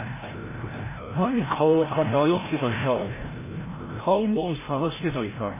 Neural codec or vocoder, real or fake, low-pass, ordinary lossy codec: codec, 16 kHz, 0.5 kbps, FreqCodec, larger model; fake; 3.6 kHz; MP3, 16 kbps